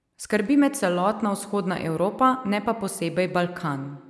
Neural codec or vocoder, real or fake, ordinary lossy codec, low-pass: none; real; none; none